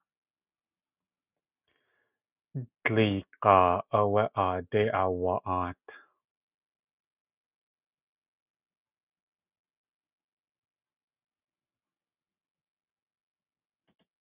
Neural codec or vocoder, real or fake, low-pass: none; real; 3.6 kHz